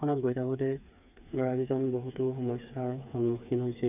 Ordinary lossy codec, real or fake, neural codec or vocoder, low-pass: none; fake; codec, 16 kHz, 4 kbps, FreqCodec, smaller model; 3.6 kHz